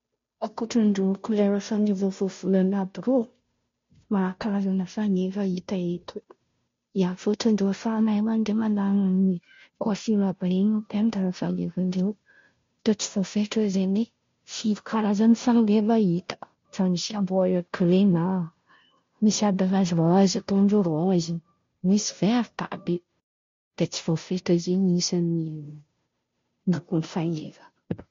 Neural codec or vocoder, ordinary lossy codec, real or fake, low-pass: codec, 16 kHz, 0.5 kbps, FunCodec, trained on Chinese and English, 25 frames a second; MP3, 48 kbps; fake; 7.2 kHz